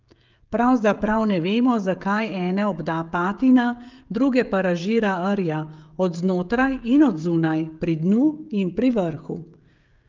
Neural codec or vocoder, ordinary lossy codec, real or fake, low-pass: codec, 16 kHz, 16 kbps, FreqCodec, smaller model; Opus, 24 kbps; fake; 7.2 kHz